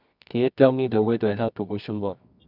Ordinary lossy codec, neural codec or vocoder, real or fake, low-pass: none; codec, 24 kHz, 0.9 kbps, WavTokenizer, medium music audio release; fake; 5.4 kHz